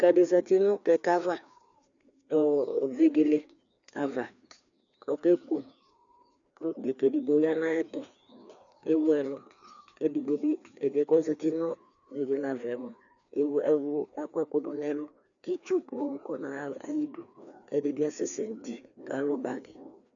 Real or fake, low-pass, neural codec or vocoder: fake; 7.2 kHz; codec, 16 kHz, 2 kbps, FreqCodec, larger model